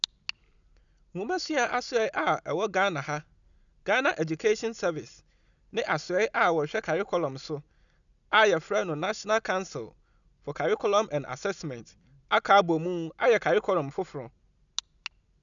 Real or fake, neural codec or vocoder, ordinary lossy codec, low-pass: real; none; none; 7.2 kHz